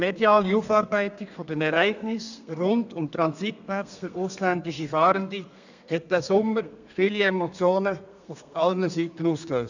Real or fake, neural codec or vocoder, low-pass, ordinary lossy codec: fake; codec, 32 kHz, 1.9 kbps, SNAC; 7.2 kHz; none